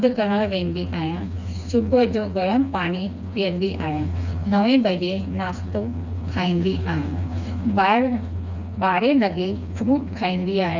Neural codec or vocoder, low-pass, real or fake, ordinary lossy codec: codec, 16 kHz, 2 kbps, FreqCodec, smaller model; 7.2 kHz; fake; none